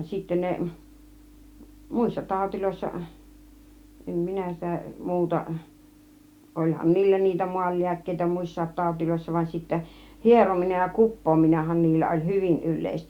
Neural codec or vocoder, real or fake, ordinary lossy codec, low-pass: none; real; Opus, 64 kbps; 19.8 kHz